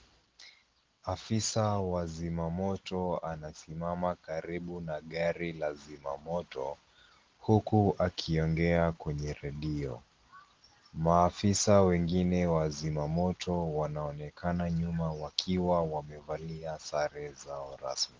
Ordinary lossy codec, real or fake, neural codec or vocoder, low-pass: Opus, 16 kbps; real; none; 7.2 kHz